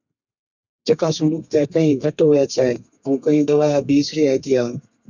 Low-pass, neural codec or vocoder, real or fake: 7.2 kHz; codec, 32 kHz, 1.9 kbps, SNAC; fake